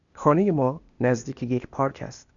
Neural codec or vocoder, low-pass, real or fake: codec, 16 kHz, 0.8 kbps, ZipCodec; 7.2 kHz; fake